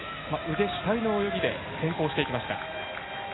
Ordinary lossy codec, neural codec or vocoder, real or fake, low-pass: AAC, 16 kbps; none; real; 7.2 kHz